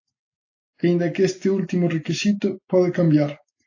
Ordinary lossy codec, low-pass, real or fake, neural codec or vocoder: AAC, 48 kbps; 7.2 kHz; real; none